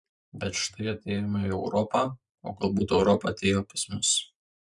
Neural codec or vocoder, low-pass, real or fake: vocoder, 44.1 kHz, 128 mel bands every 512 samples, BigVGAN v2; 10.8 kHz; fake